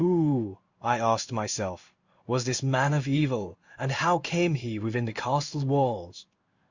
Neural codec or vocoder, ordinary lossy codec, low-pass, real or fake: codec, 16 kHz in and 24 kHz out, 1 kbps, XY-Tokenizer; Opus, 64 kbps; 7.2 kHz; fake